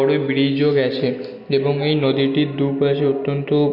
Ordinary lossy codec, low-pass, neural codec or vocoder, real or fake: none; 5.4 kHz; none; real